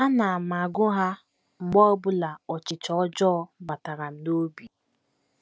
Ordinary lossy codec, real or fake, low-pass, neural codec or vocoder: none; real; none; none